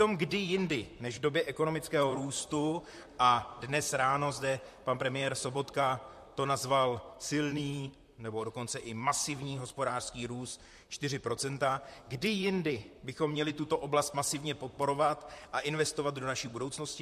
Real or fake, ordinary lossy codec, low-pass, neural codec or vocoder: fake; MP3, 64 kbps; 14.4 kHz; vocoder, 44.1 kHz, 128 mel bands, Pupu-Vocoder